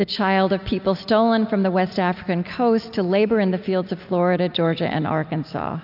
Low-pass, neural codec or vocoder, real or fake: 5.4 kHz; none; real